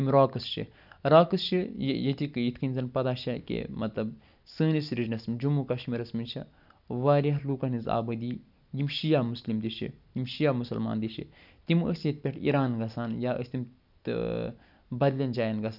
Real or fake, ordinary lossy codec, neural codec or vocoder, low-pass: real; none; none; 5.4 kHz